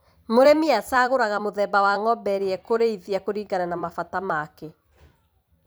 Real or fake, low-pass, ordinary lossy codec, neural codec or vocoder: fake; none; none; vocoder, 44.1 kHz, 128 mel bands every 256 samples, BigVGAN v2